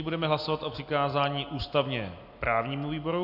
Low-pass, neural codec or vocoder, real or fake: 5.4 kHz; none; real